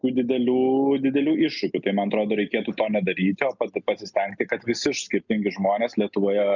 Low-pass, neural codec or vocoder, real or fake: 7.2 kHz; none; real